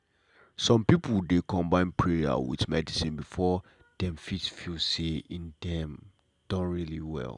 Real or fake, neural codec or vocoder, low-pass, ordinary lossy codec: real; none; 10.8 kHz; none